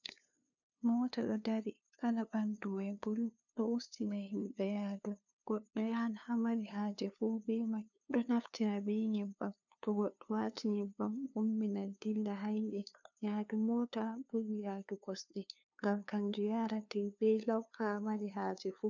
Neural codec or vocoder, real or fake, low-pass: codec, 16 kHz, 2 kbps, FunCodec, trained on LibriTTS, 25 frames a second; fake; 7.2 kHz